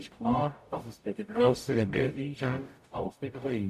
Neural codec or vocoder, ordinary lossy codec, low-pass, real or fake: codec, 44.1 kHz, 0.9 kbps, DAC; AAC, 96 kbps; 14.4 kHz; fake